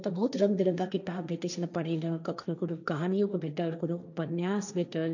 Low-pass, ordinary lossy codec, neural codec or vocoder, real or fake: 7.2 kHz; none; codec, 16 kHz, 1.1 kbps, Voila-Tokenizer; fake